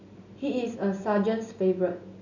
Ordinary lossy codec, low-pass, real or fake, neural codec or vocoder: none; 7.2 kHz; real; none